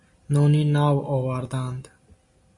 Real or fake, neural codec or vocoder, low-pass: real; none; 10.8 kHz